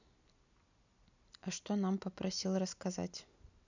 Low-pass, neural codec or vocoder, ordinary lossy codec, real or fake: 7.2 kHz; none; none; real